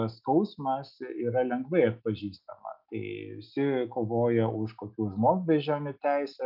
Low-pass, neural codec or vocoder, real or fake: 5.4 kHz; none; real